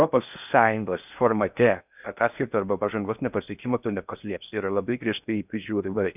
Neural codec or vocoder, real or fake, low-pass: codec, 16 kHz in and 24 kHz out, 0.6 kbps, FocalCodec, streaming, 4096 codes; fake; 3.6 kHz